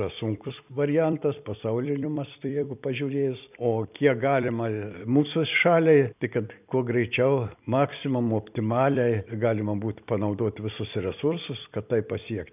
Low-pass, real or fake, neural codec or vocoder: 3.6 kHz; fake; vocoder, 44.1 kHz, 128 mel bands every 256 samples, BigVGAN v2